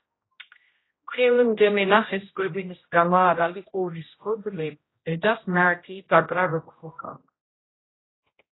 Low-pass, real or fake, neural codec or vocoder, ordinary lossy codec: 7.2 kHz; fake; codec, 16 kHz, 0.5 kbps, X-Codec, HuBERT features, trained on general audio; AAC, 16 kbps